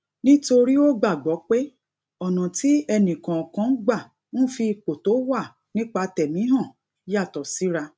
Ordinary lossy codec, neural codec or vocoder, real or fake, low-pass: none; none; real; none